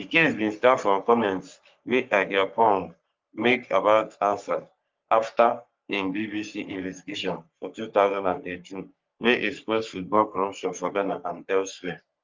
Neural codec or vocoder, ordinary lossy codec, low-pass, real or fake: codec, 44.1 kHz, 3.4 kbps, Pupu-Codec; Opus, 24 kbps; 7.2 kHz; fake